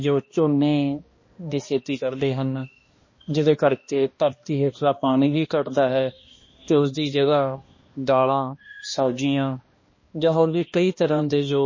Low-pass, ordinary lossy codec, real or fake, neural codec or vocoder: 7.2 kHz; MP3, 32 kbps; fake; codec, 16 kHz, 1 kbps, X-Codec, HuBERT features, trained on balanced general audio